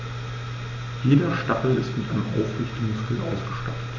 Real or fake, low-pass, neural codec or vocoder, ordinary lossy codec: real; 7.2 kHz; none; MP3, 48 kbps